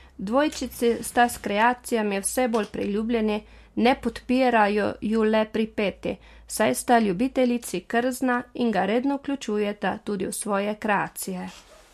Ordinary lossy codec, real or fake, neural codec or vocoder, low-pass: MP3, 64 kbps; real; none; 14.4 kHz